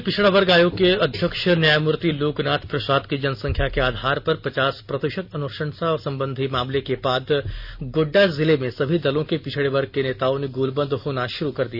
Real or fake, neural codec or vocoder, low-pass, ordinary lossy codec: real; none; 5.4 kHz; none